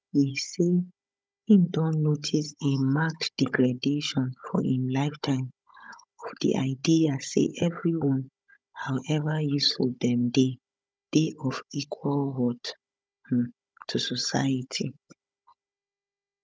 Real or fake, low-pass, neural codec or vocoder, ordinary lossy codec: fake; none; codec, 16 kHz, 16 kbps, FunCodec, trained on Chinese and English, 50 frames a second; none